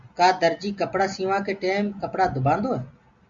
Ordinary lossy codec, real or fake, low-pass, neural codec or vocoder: Opus, 64 kbps; real; 7.2 kHz; none